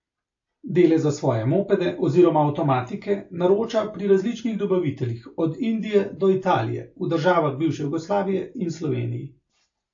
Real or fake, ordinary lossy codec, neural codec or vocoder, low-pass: real; AAC, 48 kbps; none; 7.2 kHz